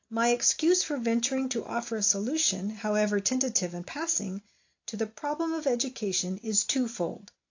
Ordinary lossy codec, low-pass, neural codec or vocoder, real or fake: AAC, 48 kbps; 7.2 kHz; none; real